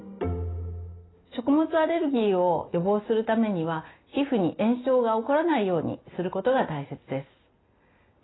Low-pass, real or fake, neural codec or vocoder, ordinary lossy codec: 7.2 kHz; real; none; AAC, 16 kbps